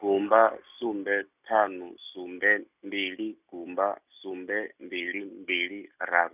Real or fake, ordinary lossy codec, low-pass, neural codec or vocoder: real; none; 3.6 kHz; none